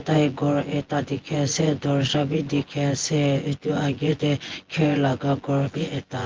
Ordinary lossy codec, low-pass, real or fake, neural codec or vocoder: Opus, 16 kbps; 7.2 kHz; fake; vocoder, 24 kHz, 100 mel bands, Vocos